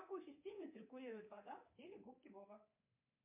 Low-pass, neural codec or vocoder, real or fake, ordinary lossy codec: 3.6 kHz; codec, 24 kHz, 3.1 kbps, DualCodec; fake; MP3, 32 kbps